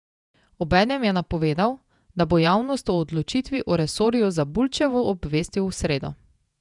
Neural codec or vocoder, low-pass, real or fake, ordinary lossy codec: vocoder, 48 kHz, 128 mel bands, Vocos; 10.8 kHz; fake; none